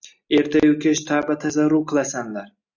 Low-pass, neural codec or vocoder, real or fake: 7.2 kHz; none; real